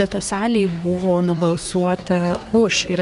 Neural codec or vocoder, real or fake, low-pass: codec, 24 kHz, 1 kbps, SNAC; fake; 10.8 kHz